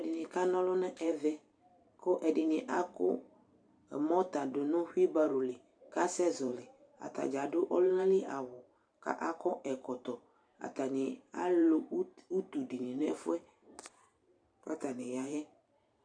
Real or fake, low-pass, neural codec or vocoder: fake; 9.9 kHz; vocoder, 44.1 kHz, 128 mel bands every 512 samples, BigVGAN v2